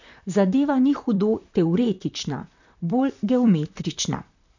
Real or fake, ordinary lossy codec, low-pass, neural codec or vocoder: fake; none; 7.2 kHz; vocoder, 44.1 kHz, 128 mel bands, Pupu-Vocoder